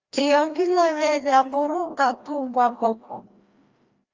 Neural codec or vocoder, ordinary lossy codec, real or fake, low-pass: codec, 16 kHz, 1 kbps, FreqCodec, larger model; Opus, 24 kbps; fake; 7.2 kHz